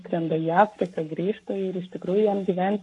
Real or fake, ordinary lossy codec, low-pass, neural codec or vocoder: fake; AAC, 48 kbps; 10.8 kHz; codec, 44.1 kHz, 7.8 kbps, Pupu-Codec